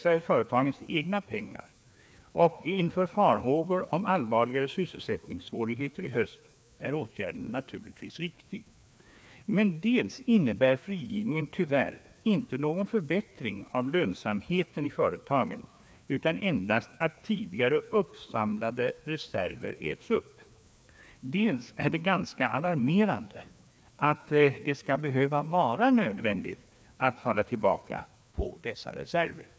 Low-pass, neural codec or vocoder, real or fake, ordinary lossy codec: none; codec, 16 kHz, 2 kbps, FreqCodec, larger model; fake; none